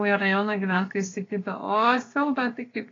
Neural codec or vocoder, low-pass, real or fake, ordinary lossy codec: codec, 16 kHz, 0.7 kbps, FocalCodec; 7.2 kHz; fake; AAC, 32 kbps